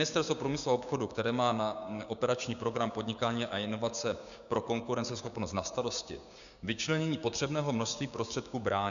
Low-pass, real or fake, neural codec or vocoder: 7.2 kHz; fake; codec, 16 kHz, 6 kbps, DAC